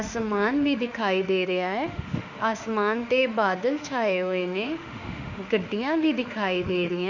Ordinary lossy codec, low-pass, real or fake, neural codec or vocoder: none; 7.2 kHz; fake; autoencoder, 48 kHz, 32 numbers a frame, DAC-VAE, trained on Japanese speech